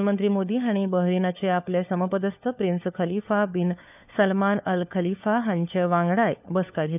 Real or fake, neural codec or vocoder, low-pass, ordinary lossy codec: fake; codec, 16 kHz, 4 kbps, FunCodec, trained on LibriTTS, 50 frames a second; 3.6 kHz; none